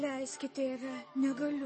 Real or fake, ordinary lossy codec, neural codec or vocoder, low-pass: fake; MP3, 32 kbps; codec, 44.1 kHz, 7.8 kbps, DAC; 10.8 kHz